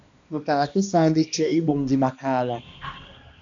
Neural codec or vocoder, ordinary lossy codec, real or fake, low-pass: codec, 16 kHz, 1 kbps, X-Codec, HuBERT features, trained on balanced general audio; AAC, 64 kbps; fake; 7.2 kHz